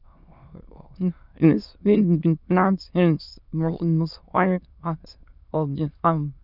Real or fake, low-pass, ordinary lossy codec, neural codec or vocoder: fake; 5.4 kHz; none; autoencoder, 22.05 kHz, a latent of 192 numbers a frame, VITS, trained on many speakers